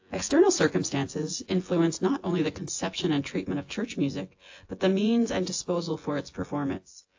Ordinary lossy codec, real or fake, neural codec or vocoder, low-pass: AAC, 48 kbps; fake; vocoder, 24 kHz, 100 mel bands, Vocos; 7.2 kHz